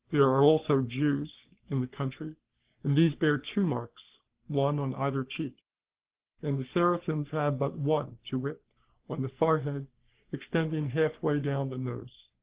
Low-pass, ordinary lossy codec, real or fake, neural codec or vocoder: 3.6 kHz; Opus, 16 kbps; fake; codec, 44.1 kHz, 3.4 kbps, Pupu-Codec